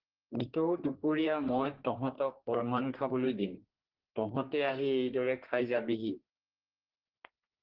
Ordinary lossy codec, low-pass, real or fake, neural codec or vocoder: Opus, 16 kbps; 5.4 kHz; fake; codec, 32 kHz, 1.9 kbps, SNAC